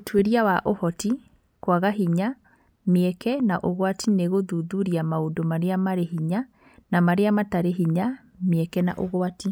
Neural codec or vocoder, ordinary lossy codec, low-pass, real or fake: none; none; none; real